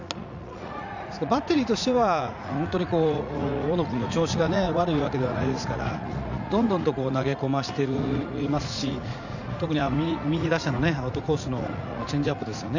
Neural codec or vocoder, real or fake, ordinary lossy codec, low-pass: vocoder, 44.1 kHz, 80 mel bands, Vocos; fake; none; 7.2 kHz